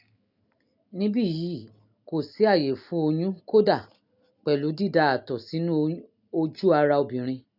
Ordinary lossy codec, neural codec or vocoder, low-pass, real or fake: none; none; 5.4 kHz; real